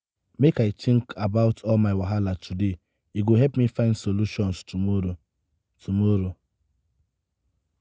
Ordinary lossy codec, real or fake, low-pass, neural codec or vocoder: none; real; none; none